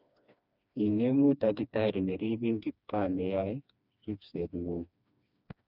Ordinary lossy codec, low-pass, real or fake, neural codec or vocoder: none; 5.4 kHz; fake; codec, 16 kHz, 2 kbps, FreqCodec, smaller model